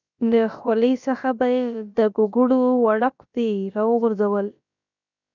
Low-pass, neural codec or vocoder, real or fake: 7.2 kHz; codec, 16 kHz, about 1 kbps, DyCAST, with the encoder's durations; fake